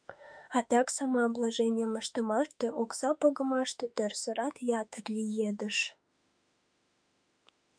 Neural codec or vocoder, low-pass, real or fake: autoencoder, 48 kHz, 32 numbers a frame, DAC-VAE, trained on Japanese speech; 9.9 kHz; fake